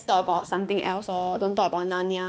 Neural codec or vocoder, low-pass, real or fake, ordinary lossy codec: codec, 16 kHz, 2 kbps, X-Codec, HuBERT features, trained on balanced general audio; none; fake; none